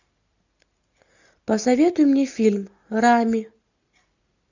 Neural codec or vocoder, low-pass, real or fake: none; 7.2 kHz; real